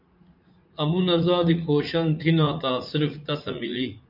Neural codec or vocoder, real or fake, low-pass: vocoder, 44.1 kHz, 80 mel bands, Vocos; fake; 5.4 kHz